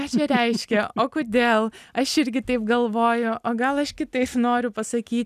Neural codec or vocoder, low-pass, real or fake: none; 14.4 kHz; real